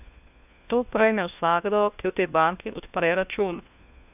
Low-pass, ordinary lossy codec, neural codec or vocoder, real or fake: 3.6 kHz; none; codec, 16 kHz, 1 kbps, FunCodec, trained on LibriTTS, 50 frames a second; fake